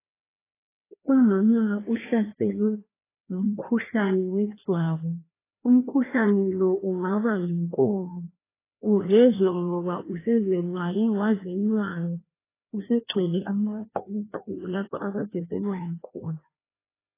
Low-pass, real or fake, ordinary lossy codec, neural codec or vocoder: 3.6 kHz; fake; AAC, 16 kbps; codec, 16 kHz, 1 kbps, FreqCodec, larger model